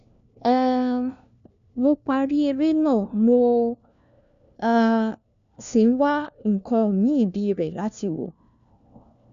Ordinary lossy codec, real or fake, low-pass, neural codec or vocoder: MP3, 96 kbps; fake; 7.2 kHz; codec, 16 kHz, 1 kbps, FunCodec, trained on LibriTTS, 50 frames a second